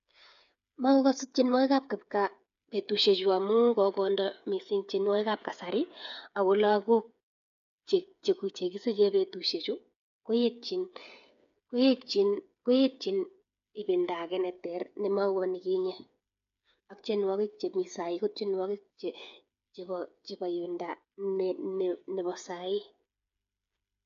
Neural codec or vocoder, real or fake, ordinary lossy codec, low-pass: codec, 16 kHz, 8 kbps, FreqCodec, smaller model; fake; none; 7.2 kHz